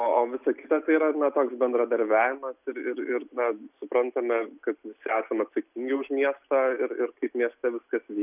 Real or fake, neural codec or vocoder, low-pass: real; none; 3.6 kHz